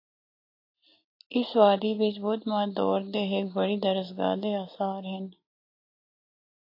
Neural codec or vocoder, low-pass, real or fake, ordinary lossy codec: none; 5.4 kHz; real; AAC, 32 kbps